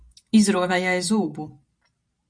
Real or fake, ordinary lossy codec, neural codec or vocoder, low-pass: fake; MP3, 64 kbps; vocoder, 44.1 kHz, 128 mel bands every 512 samples, BigVGAN v2; 9.9 kHz